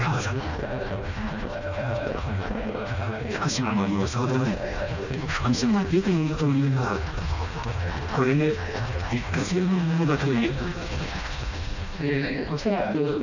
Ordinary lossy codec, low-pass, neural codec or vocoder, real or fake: none; 7.2 kHz; codec, 16 kHz, 1 kbps, FreqCodec, smaller model; fake